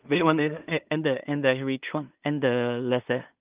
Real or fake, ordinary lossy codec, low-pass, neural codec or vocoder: fake; Opus, 32 kbps; 3.6 kHz; codec, 16 kHz in and 24 kHz out, 0.4 kbps, LongCat-Audio-Codec, two codebook decoder